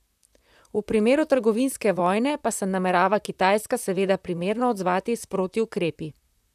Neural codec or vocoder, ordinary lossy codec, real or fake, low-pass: vocoder, 44.1 kHz, 128 mel bands, Pupu-Vocoder; none; fake; 14.4 kHz